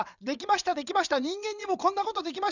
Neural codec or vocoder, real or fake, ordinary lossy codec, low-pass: vocoder, 22.05 kHz, 80 mel bands, WaveNeXt; fake; none; 7.2 kHz